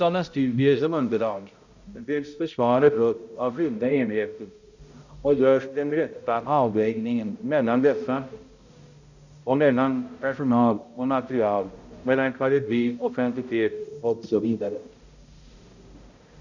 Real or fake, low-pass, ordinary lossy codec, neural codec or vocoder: fake; 7.2 kHz; none; codec, 16 kHz, 0.5 kbps, X-Codec, HuBERT features, trained on balanced general audio